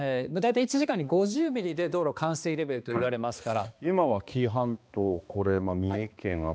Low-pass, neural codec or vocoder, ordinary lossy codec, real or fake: none; codec, 16 kHz, 2 kbps, X-Codec, HuBERT features, trained on balanced general audio; none; fake